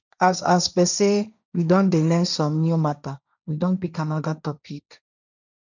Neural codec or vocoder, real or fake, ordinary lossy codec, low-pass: codec, 16 kHz, 1.1 kbps, Voila-Tokenizer; fake; none; 7.2 kHz